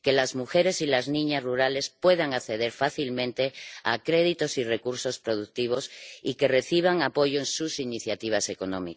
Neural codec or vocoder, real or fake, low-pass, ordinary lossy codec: none; real; none; none